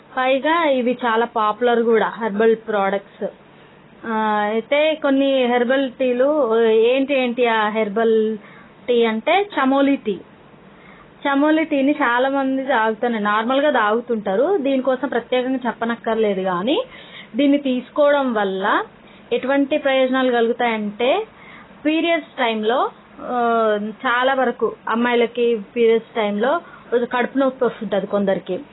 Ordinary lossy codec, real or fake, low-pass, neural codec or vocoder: AAC, 16 kbps; real; 7.2 kHz; none